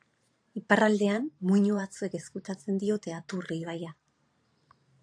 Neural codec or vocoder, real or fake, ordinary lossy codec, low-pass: vocoder, 44.1 kHz, 128 mel bands every 512 samples, BigVGAN v2; fake; AAC, 48 kbps; 9.9 kHz